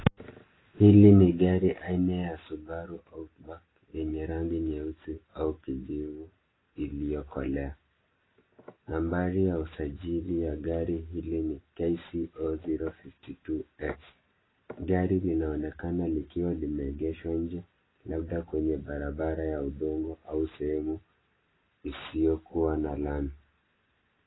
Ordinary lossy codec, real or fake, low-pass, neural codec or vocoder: AAC, 16 kbps; real; 7.2 kHz; none